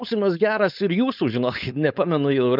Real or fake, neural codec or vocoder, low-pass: fake; codec, 16 kHz, 4.8 kbps, FACodec; 5.4 kHz